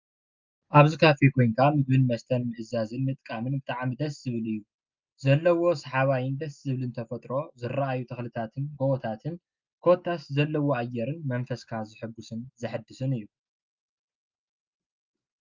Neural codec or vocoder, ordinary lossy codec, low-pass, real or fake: none; Opus, 24 kbps; 7.2 kHz; real